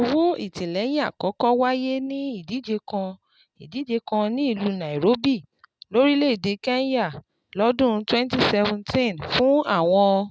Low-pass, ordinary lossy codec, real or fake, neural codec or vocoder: none; none; real; none